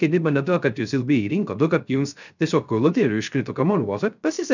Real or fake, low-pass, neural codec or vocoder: fake; 7.2 kHz; codec, 16 kHz, 0.3 kbps, FocalCodec